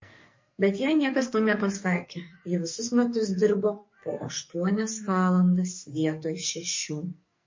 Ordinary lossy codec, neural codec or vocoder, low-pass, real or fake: MP3, 32 kbps; codec, 44.1 kHz, 2.6 kbps, SNAC; 7.2 kHz; fake